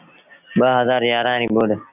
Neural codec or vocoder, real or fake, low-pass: none; real; 3.6 kHz